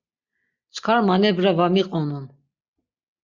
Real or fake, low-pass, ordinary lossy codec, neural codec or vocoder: real; 7.2 kHz; Opus, 64 kbps; none